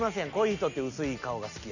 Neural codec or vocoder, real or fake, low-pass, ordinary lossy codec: none; real; 7.2 kHz; none